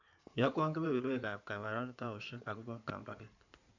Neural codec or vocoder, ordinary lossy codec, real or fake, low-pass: codec, 16 kHz in and 24 kHz out, 2.2 kbps, FireRedTTS-2 codec; none; fake; 7.2 kHz